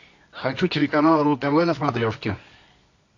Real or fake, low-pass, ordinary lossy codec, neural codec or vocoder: fake; 7.2 kHz; AAC, 48 kbps; codec, 24 kHz, 0.9 kbps, WavTokenizer, medium music audio release